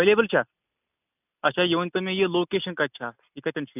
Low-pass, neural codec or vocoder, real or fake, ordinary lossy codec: 3.6 kHz; none; real; none